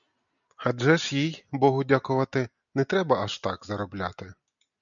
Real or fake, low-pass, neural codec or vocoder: real; 7.2 kHz; none